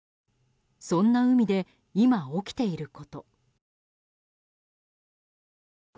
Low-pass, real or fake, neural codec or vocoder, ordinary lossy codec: none; real; none; none